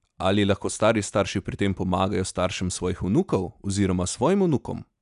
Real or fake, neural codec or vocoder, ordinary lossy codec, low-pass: real; none; none; 10.8 kHz